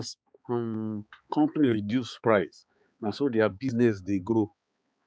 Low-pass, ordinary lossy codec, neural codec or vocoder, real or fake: none; none; codec, 16 kHz, 4 kbps, X-Codec, HuBERT features, trained on balanced general audio; fake